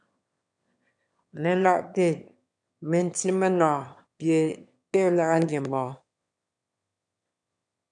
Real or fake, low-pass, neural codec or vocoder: fake; 9.9 kHz; autoencoder, 22.05 kHz, a latent of 192 numbers a frame, VITS, trained on one speaker